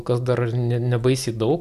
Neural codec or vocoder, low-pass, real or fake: none; 14.4 kHz; real